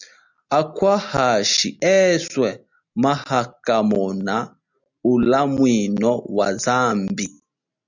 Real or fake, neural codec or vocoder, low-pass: real; none; 7.2 kHz